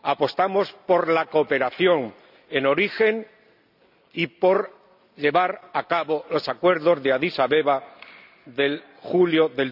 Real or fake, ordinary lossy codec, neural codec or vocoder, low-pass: real; none; none; 5.4 kHz